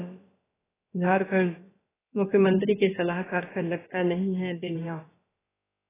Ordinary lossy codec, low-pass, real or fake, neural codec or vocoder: AAC, 16 kbps; 3.6 kHz; fake; codec, 16 kHz, about 1 kbps, DyCAST, with the encoder's durations